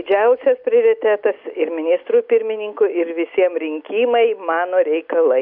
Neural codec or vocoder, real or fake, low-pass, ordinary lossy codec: none; real; 5.4 kHz; MP3, 48 kbps